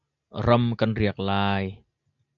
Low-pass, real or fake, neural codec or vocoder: 7.2 kHz; real; none